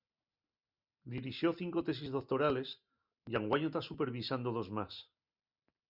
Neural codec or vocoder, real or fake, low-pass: none; real; 5.4 kHz